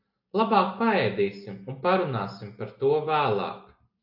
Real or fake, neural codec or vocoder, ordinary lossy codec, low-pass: real; none; AAC, 48 kbps; 5.4 kHz